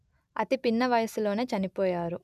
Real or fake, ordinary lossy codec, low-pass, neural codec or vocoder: real; none; 14.4 kHz; none